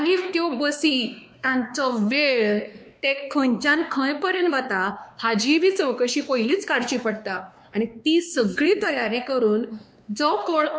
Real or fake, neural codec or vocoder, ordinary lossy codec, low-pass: fake; codec, 16 kHz, 2 kbps, X-Codec, WavLM features, trained on Multilingual LibriSpeech; none; none